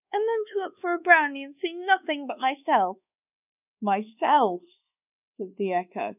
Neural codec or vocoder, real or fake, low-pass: codec, 24 kHz, 1.2 kbps, DualCodec; fake; 3.6 kHz